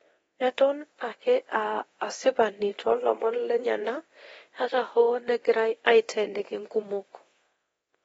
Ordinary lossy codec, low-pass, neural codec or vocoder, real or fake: AAC, 24 kbps; 10.8 kHz; codec, 24 kHz, 0.9 kbps, DualCodec; fake